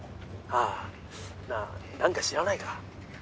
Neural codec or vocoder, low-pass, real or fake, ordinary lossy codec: none; none; real; none